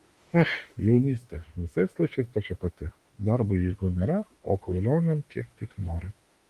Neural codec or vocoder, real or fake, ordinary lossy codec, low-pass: autoencoder, 48 kHz, 32 numbers a frame, DAC-VAE, trained on Japanese speech; fake; Opus, 32 kbps; 14.4 kHz